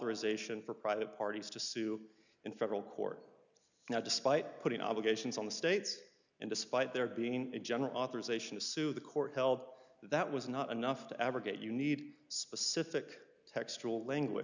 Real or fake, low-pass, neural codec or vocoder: real; 7.2 kHz; none